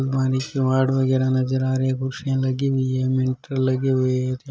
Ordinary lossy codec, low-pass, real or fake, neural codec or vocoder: none; none; real; none